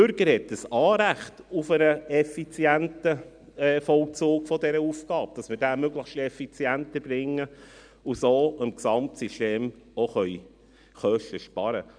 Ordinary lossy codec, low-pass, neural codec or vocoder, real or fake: none; 9.9 kHz; none; real